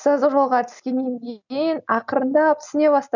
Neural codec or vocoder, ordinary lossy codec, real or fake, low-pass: none; none; real; 7.2 kHz